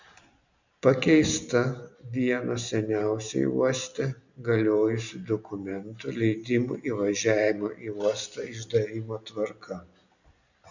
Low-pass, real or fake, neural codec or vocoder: 7.2 kHz; real; none